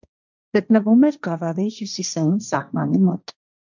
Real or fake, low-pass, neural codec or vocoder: fake; 7.2 kHz; codec, 16 kHz, 1.1 kbps, Voila-Tokenizer